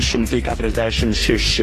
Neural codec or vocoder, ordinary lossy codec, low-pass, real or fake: codec, 44.1 kHz, 2.6 kbps, DAC; AAC, 48 kbps; 14.4 kHz; fake